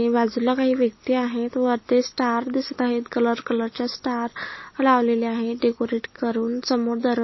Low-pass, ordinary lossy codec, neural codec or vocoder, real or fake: 7.2 kHz; MP3, 24 kbps; none; real